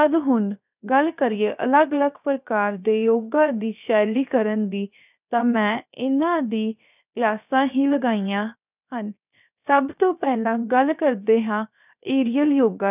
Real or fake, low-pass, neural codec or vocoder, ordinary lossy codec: fake; 3.6 kHz; codec, 16 kHz, 0.7 kbps, FocalCodec; none